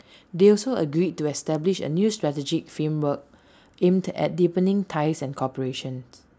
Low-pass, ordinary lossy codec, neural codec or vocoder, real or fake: none; none; none; real